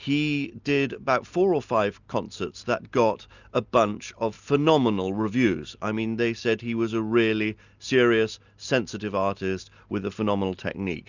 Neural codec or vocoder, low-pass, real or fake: none; 7.2 kHz; real